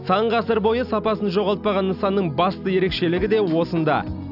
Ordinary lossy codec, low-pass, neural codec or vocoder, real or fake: none; 5.4 kHz; none; real